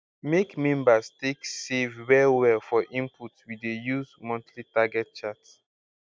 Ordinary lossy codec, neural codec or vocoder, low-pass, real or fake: none; none; none; real